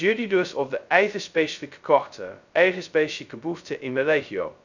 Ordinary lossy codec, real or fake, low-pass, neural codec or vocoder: none; fake; 7.2 kHz; codec, 16 kHz, 0.2 kbps, FocalCodec